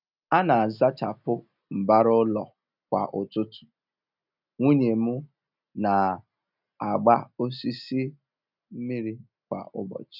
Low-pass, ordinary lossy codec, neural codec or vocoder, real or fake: 5.4 kHz; none; none; real